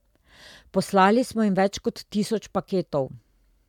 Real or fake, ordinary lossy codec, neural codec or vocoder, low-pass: real; MP3, 96 kbps; none; 19.8 kHz